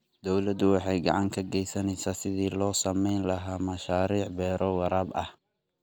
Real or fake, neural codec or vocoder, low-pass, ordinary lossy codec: real; none; none; none